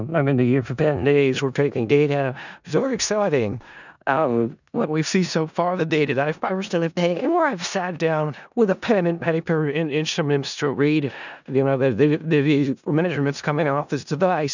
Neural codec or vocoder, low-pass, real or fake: codec, 16 kHz in and 24 kHz out, 0.4 kbps, LongCat-Audio-Codec, four codebook decoder; 7.2 kHz; fake